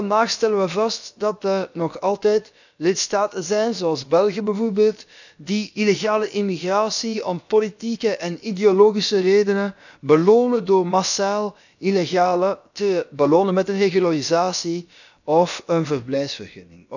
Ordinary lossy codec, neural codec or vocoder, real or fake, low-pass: none; codec, 16 kHz, about 1 kbps, DyCAST, with the encoder's durations; fake; 7.2 kHz